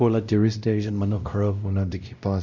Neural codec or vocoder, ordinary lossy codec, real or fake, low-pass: codec, 16 kHz, 0.5 kbps, X-Codec, WavLM features, trained on Multilingual LibriSpeech; none; fake; 7.2 kHz